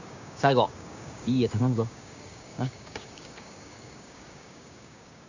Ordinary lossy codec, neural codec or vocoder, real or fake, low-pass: none; codec, 16 kHz, 6 kbps, DAC; fake; 7.2 kHz